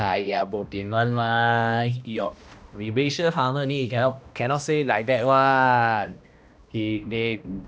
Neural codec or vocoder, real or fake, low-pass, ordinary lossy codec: codec, 16 kHz, 1 kbps, X-Codec, HuBERT features, trained on balanced general audio; fake; none; none